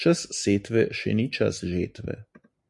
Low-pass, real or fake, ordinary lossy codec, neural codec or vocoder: 10.8 kHz; real; MP3, 64 kbps; none